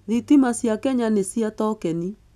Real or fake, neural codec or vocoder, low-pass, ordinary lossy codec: real; none; 14.4 kHz; none